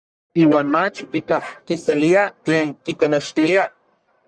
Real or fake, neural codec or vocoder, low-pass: fake; codec, 44.1 kHz, 1.7 kbps, Pupu-Codec; 9.9 kHz